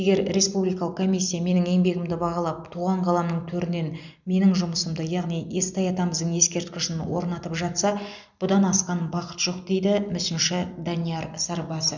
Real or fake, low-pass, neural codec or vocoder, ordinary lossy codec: real; 7.2 kHz; none; none